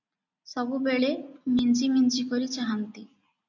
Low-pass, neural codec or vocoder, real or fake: 7.2 kHz; none; real